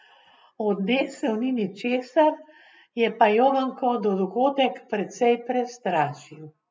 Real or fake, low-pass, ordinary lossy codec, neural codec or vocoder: real; none; none; none